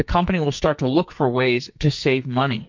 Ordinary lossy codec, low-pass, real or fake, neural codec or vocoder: MP3, 48 kbps; 7.2 kHz; fake; codec, 44.1 kHz, 2.6 kbps, SNAC